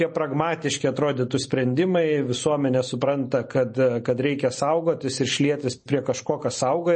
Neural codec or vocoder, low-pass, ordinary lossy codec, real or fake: vocoder, 44.1 kHz, 128 mel bands every 256 samples, BigVGAN v2; 10.8 kHz; MP3, 32 kbps; fake